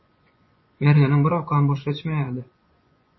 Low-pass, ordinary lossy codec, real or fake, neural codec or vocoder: 7.2 kHz; MP3, 24 kbps; fake; vocoder, 24 kHz, 100 mel bands, Vocos